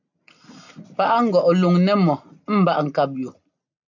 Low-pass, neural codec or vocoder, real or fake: 7.2 kHz; none; real